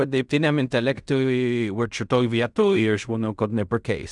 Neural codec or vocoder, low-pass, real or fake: codec, 16 kHz in and 24 kHz out, 0.4 kbps, LongCat-Audio-Codec, fine tuned four codebook decoder; 10.8 kHz; fake